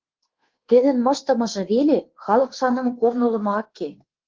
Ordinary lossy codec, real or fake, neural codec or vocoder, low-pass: Opus, 16 kbps; fake; codec, 24 kHz, 1.2 kbps, DualCodec; 7.2 kHz